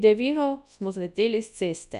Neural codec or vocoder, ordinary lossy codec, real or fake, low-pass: codec, 24 kHz, 0.9 kbps, WavTokenizer, large speech release; none; fake; 10.8 kHz